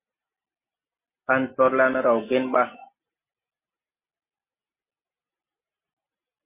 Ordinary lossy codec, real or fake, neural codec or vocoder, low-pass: MP3, 24 kbps; real; none; 3.6 kHz